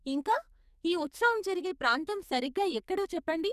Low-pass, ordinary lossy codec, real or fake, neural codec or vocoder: 14.4 kHz; none; fake; codec, 44.1 kHz, 2.6 kbps, SNAC